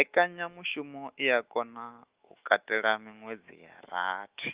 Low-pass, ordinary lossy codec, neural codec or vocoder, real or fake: 3.6 kHz; Opus, 32 kbps; autoencoder, 48 kHz, 128 numbers a frame, DAC-VAE, trained on Japanese speech; fake